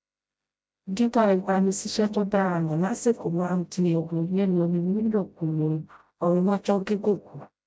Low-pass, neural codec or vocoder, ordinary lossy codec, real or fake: none; codec, 16 kHz, 0.5 kbps, FreqCodec, smaller model; none; fake